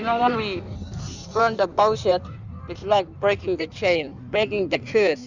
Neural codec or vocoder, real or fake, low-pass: codec, 16 kHz in and 24 kHz out, 1.1 kbps, FireRedTTS-2 codec; fake; 7.2 kHz